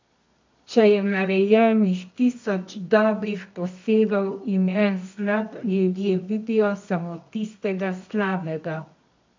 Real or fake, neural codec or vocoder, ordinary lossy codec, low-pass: fake; codec, 24 kHz, 0.9 kbps, WavTokenizer, medium music audio release; MP3, 64 kbps; 7.2 kHz